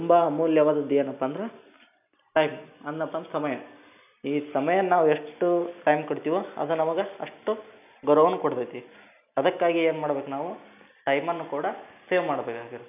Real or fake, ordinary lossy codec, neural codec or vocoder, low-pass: real; none; none; 3.6 kHz